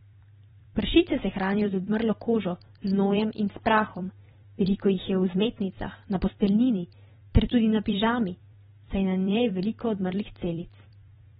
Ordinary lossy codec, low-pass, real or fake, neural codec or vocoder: AAC, 16 kbps; 19.8 kHz; fake; vocoder, 44.1 kHz, 128 mel bands every 256 samples, BigVGAN v2